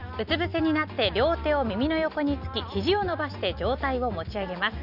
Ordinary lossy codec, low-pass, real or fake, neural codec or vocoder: none; 5.4 kHz; real; none